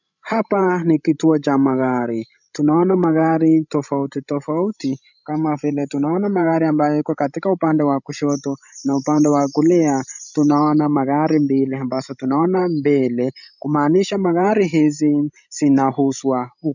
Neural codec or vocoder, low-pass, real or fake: codec, 16 kHz, 16 kbps, FreqCodec, larger model; 7.2 kHz; fake